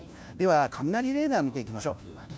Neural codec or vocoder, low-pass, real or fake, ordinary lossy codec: codec, 16 kHz, 1 kbps, FunCodec, trained on LibriTTS, 50 frames a second; none; fake; none